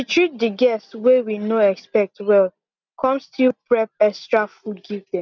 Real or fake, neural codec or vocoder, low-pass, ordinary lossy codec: real; none; 7.2 kHz; none